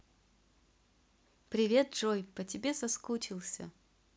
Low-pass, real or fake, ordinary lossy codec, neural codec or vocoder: none; real; none; none